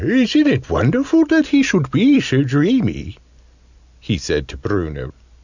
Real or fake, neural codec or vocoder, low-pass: real; none; 7.2 kHz